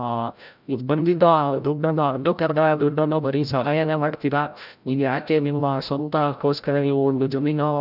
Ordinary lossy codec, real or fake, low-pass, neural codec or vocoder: none; fake; 5.4 kHz; codec, 16 kHz, 0.5 kbps, FreqCodec, larger model